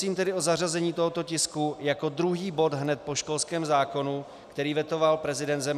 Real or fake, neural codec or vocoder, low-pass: real; none; 14.4 kHz